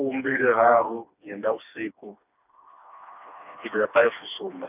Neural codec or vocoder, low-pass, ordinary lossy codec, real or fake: codec, 16 kHz, 2 kbps, FreqCodec, smaller model; 3.6 kHz; none; fake